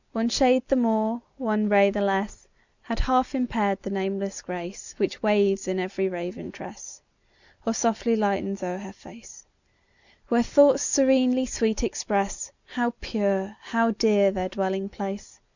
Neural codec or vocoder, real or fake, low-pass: none; real; 7.2 kHz